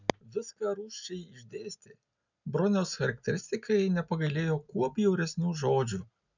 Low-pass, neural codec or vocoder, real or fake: 7.2 kHz; none; real